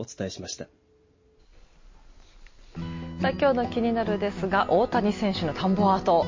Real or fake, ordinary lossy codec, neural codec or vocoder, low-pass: real; MP3, 32 kbps; none; 7.2 kHz